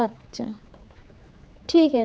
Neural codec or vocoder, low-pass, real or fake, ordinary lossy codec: codec, 16 kHz, 4 kbps, X-Codec, HuBERT features, trained on general audio; none; fake; none